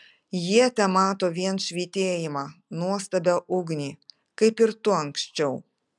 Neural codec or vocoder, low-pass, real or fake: none; 10.8 kHz; real